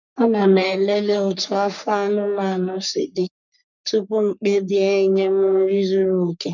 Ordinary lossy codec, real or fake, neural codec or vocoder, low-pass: none; fake; codec, 44.1 kHz, 3.4 kbps, Pupu-Codec; 7.2 kHz